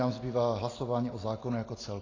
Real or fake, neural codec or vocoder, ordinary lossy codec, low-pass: real; none; AAC, 32 kbps; 7.2 kHz